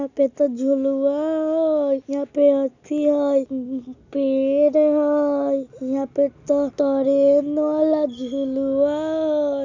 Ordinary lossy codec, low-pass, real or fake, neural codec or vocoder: none; 7.2 kHz; real; none